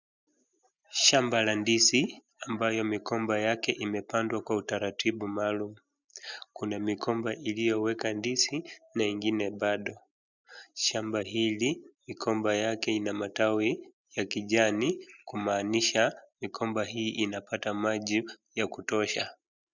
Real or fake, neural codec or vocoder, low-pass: real; none; 7.2 kHz